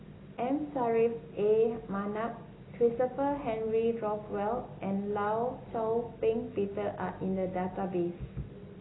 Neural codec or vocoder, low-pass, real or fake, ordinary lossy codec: none; 7.2 kHz; real; AAC, 16 kbps